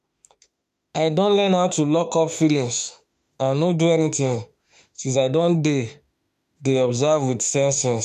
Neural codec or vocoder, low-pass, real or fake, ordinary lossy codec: autoencoder, 48 kHz, 32 numbers a frame, DAC-VAE, trained on Japanese speech; 14.4 kHz; fake; MP3, 96 kbps